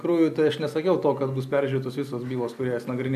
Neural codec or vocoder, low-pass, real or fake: none; 14.4 kHz; real